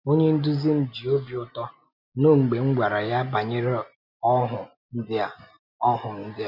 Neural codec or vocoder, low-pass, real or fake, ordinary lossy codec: none; 5.4 kHz; real; none